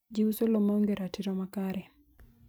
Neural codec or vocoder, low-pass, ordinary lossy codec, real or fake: vocoder, 44.1 kHz, 128 mel bands every 256 samples, BigVGAN v2; none; none; fake